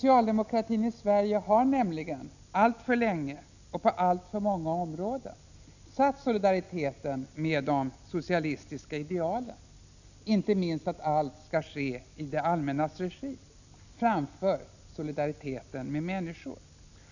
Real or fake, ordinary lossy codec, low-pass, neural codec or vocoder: real; none; 7.2 kHz; none